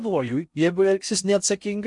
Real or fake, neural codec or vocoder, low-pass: fake; codec, 16 kHz in and 24 kHz out, 0.6 kbps, FocalCodec, streaming, 4096 codes; 10.8 kHz